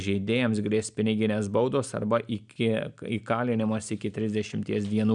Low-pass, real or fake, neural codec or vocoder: 9.9 kHz; real; none